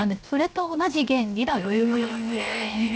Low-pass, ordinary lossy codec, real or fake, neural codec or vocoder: none; none; fake; codec, 16 kHz, 0.7 kbps, FocalCodec